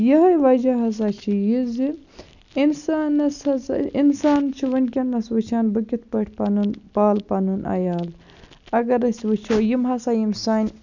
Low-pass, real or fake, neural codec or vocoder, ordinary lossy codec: 7.2 kHz; real; none; none